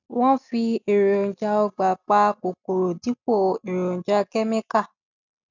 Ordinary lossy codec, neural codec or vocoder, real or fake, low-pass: none; none; real; 7.2 kHz